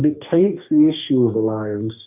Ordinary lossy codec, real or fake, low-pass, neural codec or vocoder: AAC, 32 kbps; fake; 3.6 kHz; codec, 44.1 kHz, 2.6 kbps, DAC